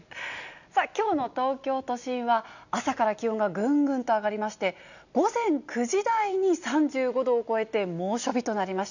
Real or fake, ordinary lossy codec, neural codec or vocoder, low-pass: real; none; none; 7.2 kHz